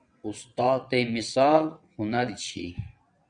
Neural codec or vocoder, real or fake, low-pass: vocoder, 22.05 kHz, 80 mel bands, WaveNeXt; fake; 9.9 kHz